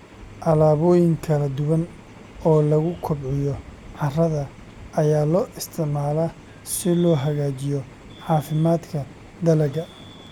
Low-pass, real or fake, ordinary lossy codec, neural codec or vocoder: 19.8 kHz; real; none; none